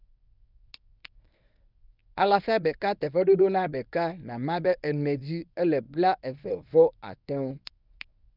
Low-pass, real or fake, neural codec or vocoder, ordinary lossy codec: 5.4 kHz; fake; codec, 24 kHz, 0.9 kbps, WavTokenizer, medium speech release version 1; none